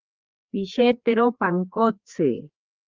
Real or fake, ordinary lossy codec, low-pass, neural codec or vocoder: fake; Opus, 32 kbps; 7.2 kHz; codec, 16 kHz, 2 kbps, FreqCodec, larger model